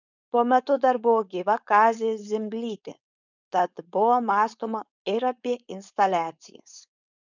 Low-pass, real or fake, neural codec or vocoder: 7.2 kHz; fake; codec, 16 kHz, 4.8 kbps, FACodec